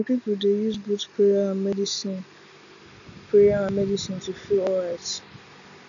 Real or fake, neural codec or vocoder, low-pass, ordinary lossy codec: real; none; 7.2 kHz; MP3, 96 kbps